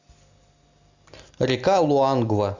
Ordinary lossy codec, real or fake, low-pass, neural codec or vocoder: Opus, 64 kbps; real; 7.2 kHz; none